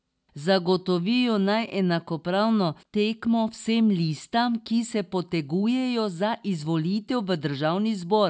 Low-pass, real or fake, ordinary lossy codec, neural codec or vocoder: none; real; none; none